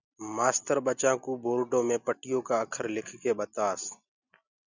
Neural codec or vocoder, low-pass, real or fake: none; 7.2 kHz; real